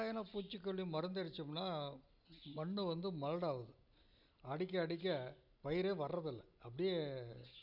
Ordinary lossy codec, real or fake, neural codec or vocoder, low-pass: none; real; none; 5.4 kHz